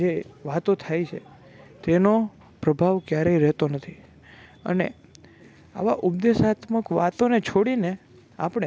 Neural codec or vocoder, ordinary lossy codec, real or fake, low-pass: none; none; real; none